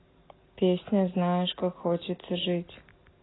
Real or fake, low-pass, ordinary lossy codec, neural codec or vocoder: real; 7.2 kHz; AAC, 16 kbps; none